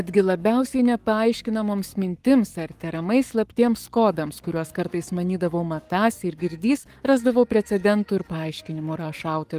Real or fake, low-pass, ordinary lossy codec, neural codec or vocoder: fake; 14.4 kHz; Opus, 32 kbps; codec, 44.1 kHz, 7.8 kbps, Pupu-Codec